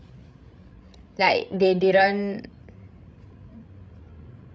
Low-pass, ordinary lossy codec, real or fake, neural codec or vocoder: none; none; fake; codec, 16 kHz, 16 kbps, FreqCodec, larger model